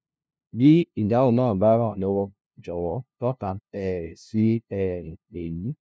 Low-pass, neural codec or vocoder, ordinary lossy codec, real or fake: none; codec, 16 kHz, 0.5 kbps, FunCodec, trained on LibriTTS, 25 frames a second; none; fake